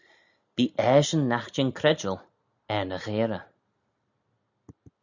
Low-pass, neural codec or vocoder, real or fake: 7.2 kHz; none; real